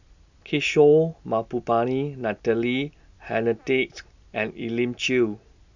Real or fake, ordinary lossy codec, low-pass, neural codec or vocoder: real; none; 7.2 kHz; none